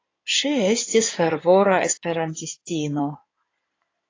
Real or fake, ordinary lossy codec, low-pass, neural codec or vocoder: fake; AAC, 32 kbps; 7.2 kHz; codec, 16 kHz in and 24 kHz out, 2.2 kbps, FireRedTTS-2 codec